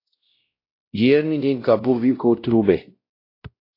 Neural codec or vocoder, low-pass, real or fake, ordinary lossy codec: codec, 16 kHz, 0.5 kbps, X-Codec, WavLM features, trained on Multilingual LibriSpeech; 5.4 kHz; fake; AAC, 32 kbps